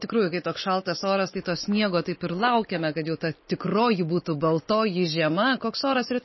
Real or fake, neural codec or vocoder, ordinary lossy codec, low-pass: real; none; MP3, 24 kbps; 7.2 kHz